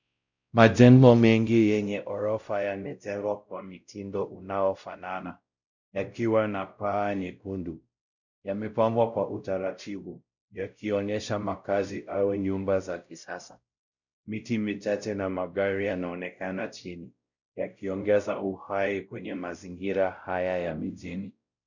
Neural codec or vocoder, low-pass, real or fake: codec, 16 kHz, 0.5 kbps, X-Codec, WavLM features, trained on Multilingual LibriSpeech; 7.2 kHz; fake